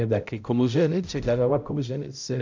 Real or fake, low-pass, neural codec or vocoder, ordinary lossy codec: fake; 7.2 kHz; codec, 16 kHz, 0.5 kbps, X-Codec, HuBERT features, trained on balanced general audio; MP3, 64 kbps